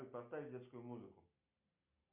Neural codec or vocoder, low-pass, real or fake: none; 3.6 kHz; real